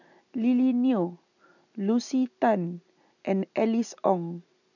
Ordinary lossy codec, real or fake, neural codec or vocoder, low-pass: none; real; none; 7.2 kHz